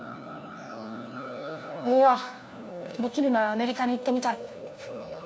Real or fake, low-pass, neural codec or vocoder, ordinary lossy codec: fake; none; codec, 16 kHz, 1 kbps, FunCodec, trained on LibriTTS, 50 frames a second; none